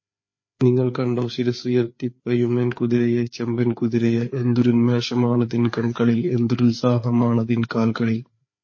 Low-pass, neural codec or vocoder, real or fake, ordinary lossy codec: 7.2 kHz; codec, 16 kHz, 4 kbps, FreqCodec, larger model; fake; MP3, 32 kbps